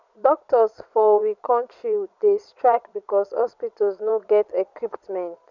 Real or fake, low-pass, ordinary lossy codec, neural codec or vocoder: fake; 7.2 kHz; none; vocoder, 22.05 kHz, 80 mel bands, Vocos